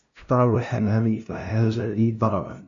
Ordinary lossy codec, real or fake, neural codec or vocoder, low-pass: MP3, 64 kbps; fake; codec, 16 kHz, 0.5 kbps, FunCodec, trained on LibriTTS, 25 frames a second; 7.2 kHz